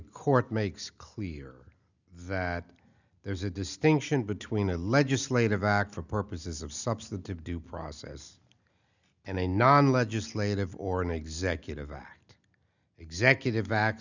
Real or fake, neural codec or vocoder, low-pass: real; none; 7.2 kHz